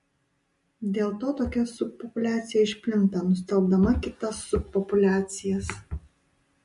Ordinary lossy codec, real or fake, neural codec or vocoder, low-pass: MP3, 48 kbps; real; none; 14.4 kHz